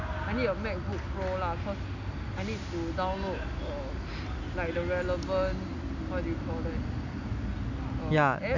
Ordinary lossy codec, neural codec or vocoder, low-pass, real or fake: none; none; 7.2 kHz; real